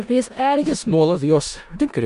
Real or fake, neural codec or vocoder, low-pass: fake; codec, 16 kHz in and 24 kHz out, 0.4 kbps, LongCat-Audio-Codec, four codebook decoder; 10.8 kHz